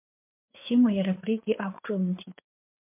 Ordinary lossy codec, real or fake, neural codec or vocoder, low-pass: MP3, 32 kbps; fake; codec, 16 kHz, 4 kbps, FreqCodec, larger model; 3.6 kHz